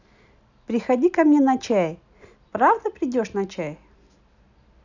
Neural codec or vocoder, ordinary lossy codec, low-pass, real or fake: none; none; 7.2 kHz; real